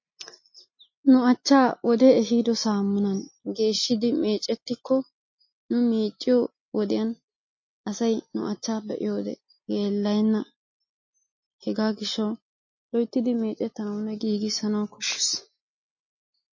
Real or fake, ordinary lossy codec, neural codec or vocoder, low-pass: real; MP3, 32 kbps; none; 7.2 kHz